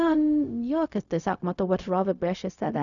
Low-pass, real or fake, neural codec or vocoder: 7.2 kHz; fake; codec, 16 kHz, 0.4 kbps, LongCat-Audio-Codec